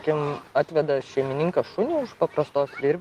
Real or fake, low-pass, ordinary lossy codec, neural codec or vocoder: real; 14.4 kHz; Opus, 24 kbps; none